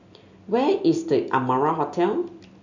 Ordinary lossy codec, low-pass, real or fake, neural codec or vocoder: none; 7.2 kHz; real; none